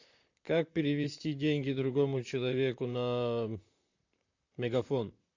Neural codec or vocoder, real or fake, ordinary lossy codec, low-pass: vocoder, 44.1 kHz, 128 mel bands, Pupu-Vocoder; fake; AAC, 48 kbps; 7.2 kHz